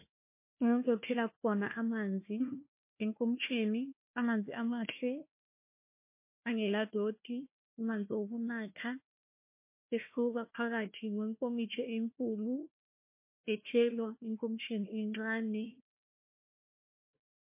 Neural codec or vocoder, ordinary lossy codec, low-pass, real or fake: codec, 16 kHz, 1 kbps, FunCodec, trained on Chinese and English, 50 frames a second; MP3, 24 kbps; 3.6 kHz; fake